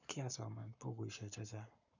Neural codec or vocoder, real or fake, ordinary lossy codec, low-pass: codec, 44.1 kHz, 7.8 kbps, Pupu-Codec; fake; none; 7.2 kHz